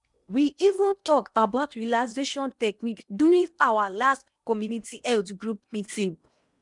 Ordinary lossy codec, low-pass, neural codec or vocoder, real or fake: none; 10.8 kHz; codec, 16 kHz in and 24 kHz out, 0.8 kbps, FocalCodec, streaming, 65536 codes; fake